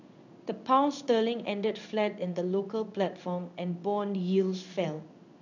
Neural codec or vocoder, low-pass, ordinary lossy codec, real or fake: codec, 16 kHz in and 24 kHz out, 1 kbps, XY-Tokenizer; 7.2 kHz; none; fake